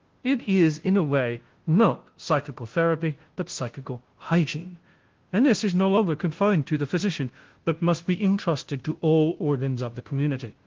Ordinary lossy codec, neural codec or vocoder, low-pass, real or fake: Opus, 16 kbps; codec, 16 kHz, 0.5 kbps, FunCodec, trained on Chinese and English, 25 frames a second; 7.2 kHz; fake